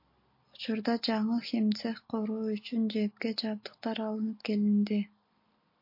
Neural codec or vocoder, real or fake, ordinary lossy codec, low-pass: none; real; MP3, 32 kbps; 5.4 kHz